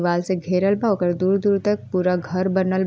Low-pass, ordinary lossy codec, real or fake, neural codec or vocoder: none; none; real; none